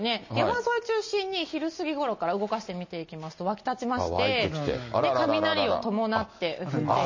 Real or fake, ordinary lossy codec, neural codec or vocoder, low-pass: real; MP3, 32 kbps; none; 7.2 kHz